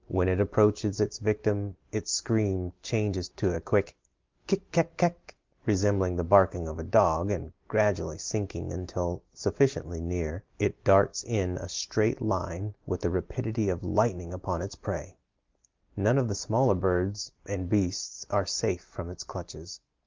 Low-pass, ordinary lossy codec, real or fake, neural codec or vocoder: 7.2 kHz; Opus, 24 kbps; real; none